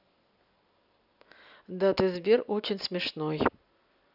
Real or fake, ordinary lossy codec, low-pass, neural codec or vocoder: real; none; 5.4 kHz; none